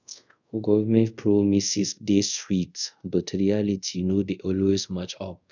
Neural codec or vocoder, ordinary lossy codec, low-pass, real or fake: codec, 24 kHz, 0.5 kbps, DualCodec; none; 7.2 kHz; fake